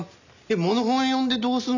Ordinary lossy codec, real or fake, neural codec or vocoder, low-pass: none; real; none; 7.2 kHz